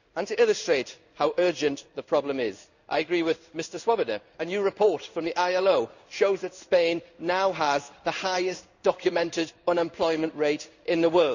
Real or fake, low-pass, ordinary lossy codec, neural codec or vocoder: fake; 7.2 kHz; none; codec, 16 kHz in and 24 kHz out, 1 kbps, XY-Tokenizer